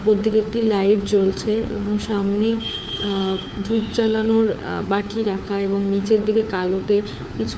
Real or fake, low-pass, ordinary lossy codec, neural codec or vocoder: fake; none; none; codec, 16 kHz, 4 kbps, FunCodec, trained on LibriTTS, 50 frames a second